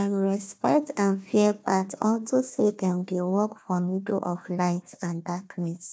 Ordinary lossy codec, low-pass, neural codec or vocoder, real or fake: none; none; codec, 16 kHz, 1 kbps, FunCodec, trained on Chinese and English, 50 frames a second; fake